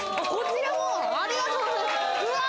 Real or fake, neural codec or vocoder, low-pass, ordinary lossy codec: real; none; none; none